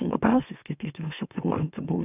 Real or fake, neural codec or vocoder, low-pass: fake; autoencoder, 44.1 kHz, a latent of 192 numbers a frame, MeloTTS; 3.6 kHz